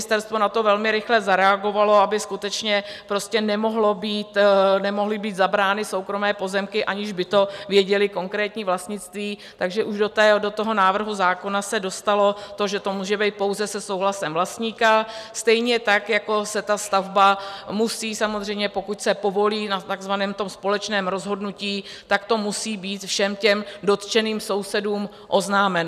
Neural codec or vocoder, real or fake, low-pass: none; real; 14.4 kHz